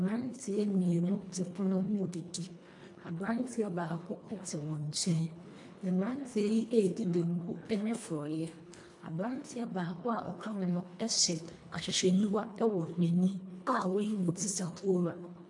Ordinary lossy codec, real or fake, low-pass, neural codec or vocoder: AAC, 64 kbps; fake; 10.8 kHz; codec, 24 kHz, 1.5 kbps, HILCodec